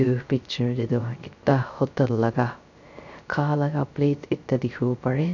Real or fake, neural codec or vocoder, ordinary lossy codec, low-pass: fake; codec, 16 kHz, 0.3 kbps, FocalCodec; none; 7.2 kHz